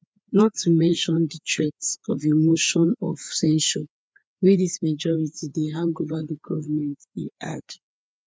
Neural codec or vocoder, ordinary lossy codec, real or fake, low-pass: codec, 16 kHz, 4 kbps, FreqCodec, larger model; none; fake; none